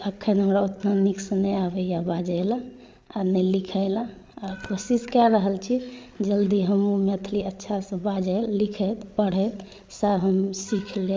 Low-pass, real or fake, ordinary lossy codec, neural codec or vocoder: none; fake; none; codec, 16 kHz, 8 kbps, FreqCodec, larger model